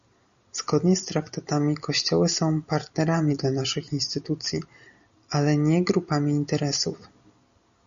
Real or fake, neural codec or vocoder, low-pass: real; none; 7.2 kHz